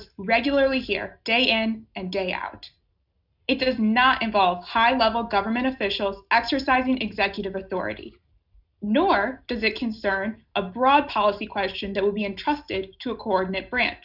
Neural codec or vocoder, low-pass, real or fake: none; 5.4 kHz; real